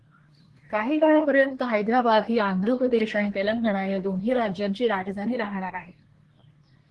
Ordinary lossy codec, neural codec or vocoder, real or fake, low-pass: Opus, 16 kbps; codec, 24 kHz, 1 kbps, SNAC; fake; 10.8 kHz